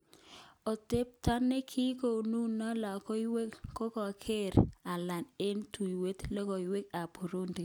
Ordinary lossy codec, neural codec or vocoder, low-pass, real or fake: none; none; none; real